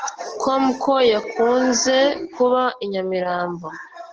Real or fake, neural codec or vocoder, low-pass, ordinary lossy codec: real; none; 7.2 kHz; Opus, 16 kbps